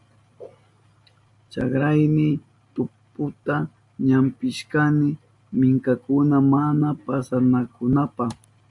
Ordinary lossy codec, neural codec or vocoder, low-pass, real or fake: MP3, 64 kbps; none; 10.8 kHz; real